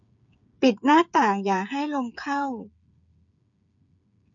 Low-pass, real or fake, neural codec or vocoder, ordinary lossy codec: 7.2 kHz; fake; codec, 16 kHz, 8 kbps, FreqCodec, smaller model; none